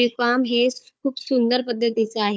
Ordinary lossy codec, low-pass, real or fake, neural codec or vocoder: none; none; fake; codec, 16 kHz, 4 kbps, FunCodec, trained on Chinese and English, 50 frames a second